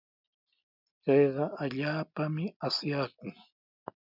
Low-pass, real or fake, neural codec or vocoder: 5.4 kHz; real; none